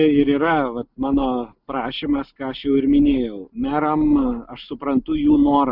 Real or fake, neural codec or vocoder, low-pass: real; none; 5.4 kHz